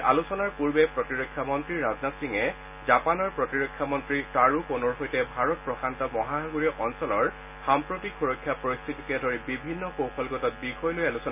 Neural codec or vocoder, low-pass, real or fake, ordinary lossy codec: none; 3.6 kHz; real; none